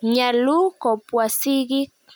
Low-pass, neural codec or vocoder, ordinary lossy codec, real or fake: none; none; none; real